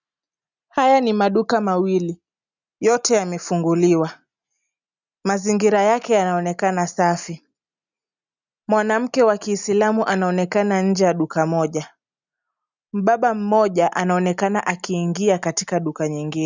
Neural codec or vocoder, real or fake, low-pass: none; real; 7.2 kHz